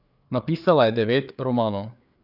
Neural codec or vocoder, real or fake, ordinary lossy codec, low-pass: codec, 16 kHz, 4 kbps, FreqCodec, larger model; fake; none; 5.4 kHz